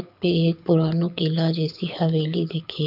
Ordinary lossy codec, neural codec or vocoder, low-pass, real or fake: none; codec, 24 kHz, 6 kbps, HILCodec; 5.4 kHz; fake